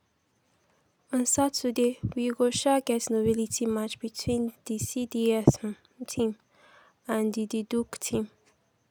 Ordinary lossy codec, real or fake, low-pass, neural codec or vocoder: none; real; none; none